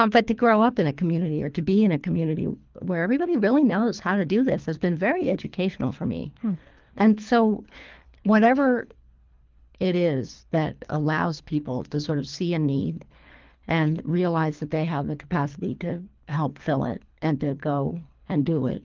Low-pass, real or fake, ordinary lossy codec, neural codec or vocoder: 7.2 kHz; fake; Opus, 24 kbps; codec, 24 kHz, 3 kbps, HILCodec